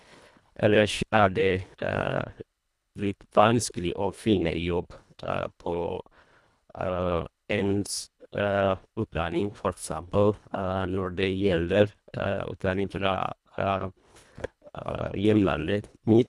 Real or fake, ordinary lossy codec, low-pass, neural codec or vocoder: fake; none; none; codec, 24 kHz, 1.5 kbps, HILCodec